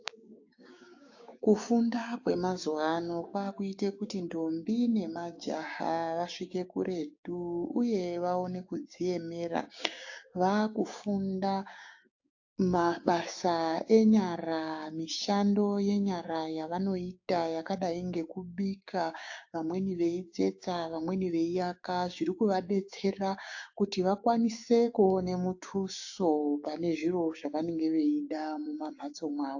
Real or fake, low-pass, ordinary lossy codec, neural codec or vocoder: fake; 7.2 kHz; AAC, 48 kbps; codec, 16 kHz, 6 kbps, DAC